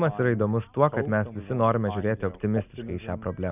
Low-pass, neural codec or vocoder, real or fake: 3.6 kHz; none; real